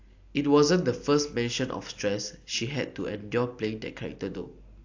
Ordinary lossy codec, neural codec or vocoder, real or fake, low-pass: AAC, 48 kbps; none; real; 7.2 kHz